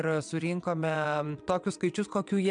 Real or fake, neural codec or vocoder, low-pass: fake; vocoder, 22.05 kHz, 80 mel bands, WaveNeXt; 9.9 kHz